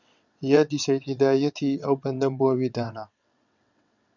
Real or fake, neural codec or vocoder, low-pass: fake; vocoder, 22.05 kHz, 80 mel bands, WaveNeXt; 7.2 kHz